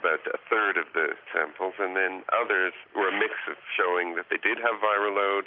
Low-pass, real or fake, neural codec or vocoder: 5.4 kHz; real; none